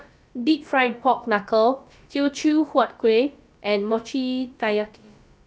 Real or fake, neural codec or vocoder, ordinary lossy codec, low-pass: fake; codec, 16 kHz, about 1 kbps, DyCAST, with the encoder's durations; none; none